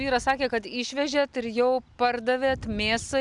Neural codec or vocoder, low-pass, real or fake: none; 10.8 kHz; real